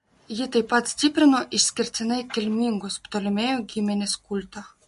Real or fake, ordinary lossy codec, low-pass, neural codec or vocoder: real; MP3, 48 kbps; 14.4 kHz; none